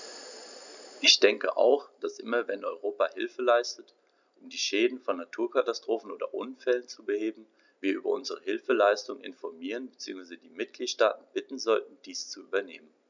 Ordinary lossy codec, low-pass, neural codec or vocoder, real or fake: none; 7.2 kHz; none; real